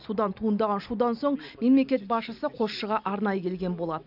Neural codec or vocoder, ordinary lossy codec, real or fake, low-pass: none; none; real; 5.4 kHz